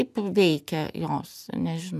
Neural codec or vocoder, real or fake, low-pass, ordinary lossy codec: none; real; 14.4 kHz; MP3, 96 kbps